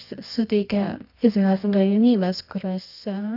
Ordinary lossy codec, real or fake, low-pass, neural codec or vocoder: AAC, 48 kbps; fake; 5.4 kHz; codec, 24 kHz, 0.9 kbps, WavTokenizer, medium music audio release